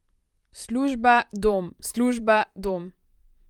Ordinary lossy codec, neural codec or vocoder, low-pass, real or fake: Opus, 32 kbps; vocoder, 44.1 kHz, 128 mel bands, Pupu-Vocoder; 19.8 kHz; fake